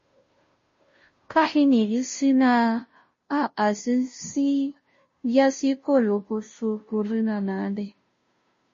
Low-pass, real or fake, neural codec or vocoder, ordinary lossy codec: 7.2 kHz; fake; codec, 16 kHz, 0.5 kbps, FunCodec, trained on Chinese and English, 25 frames a second; MP3, 32 kbps